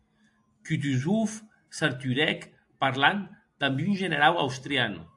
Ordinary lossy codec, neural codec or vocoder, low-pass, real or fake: AAC, 64 kbps; none; 10.8 kHz; real